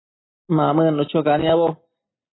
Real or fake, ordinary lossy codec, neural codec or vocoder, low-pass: real; AAC, 16 kbps; none; 7.2 kHz